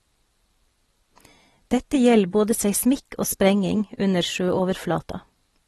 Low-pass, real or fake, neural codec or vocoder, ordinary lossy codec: 19.8 kHz; real; none; AAC, 32 kbps